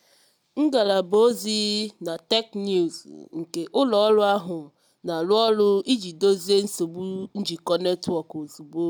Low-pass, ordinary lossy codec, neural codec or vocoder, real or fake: none; none; none; real